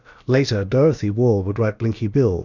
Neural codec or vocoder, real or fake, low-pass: codec, 16 kHz, about 1 kbps, DyCAST, with the encoder's durations; fake; 7.2 kHz